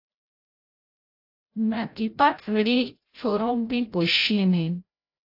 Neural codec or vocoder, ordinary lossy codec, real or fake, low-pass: codec, 16 kHz, 0.5 kbps, FreqCodec, larger model; AAC, 32 kbps; fake; 5.4 kHz